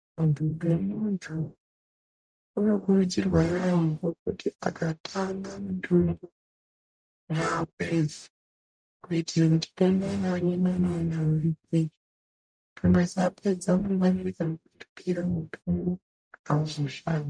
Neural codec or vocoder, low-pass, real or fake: codec, 44.1 kHz, 0.9 kbps, DAC; 9.9 kHz; fake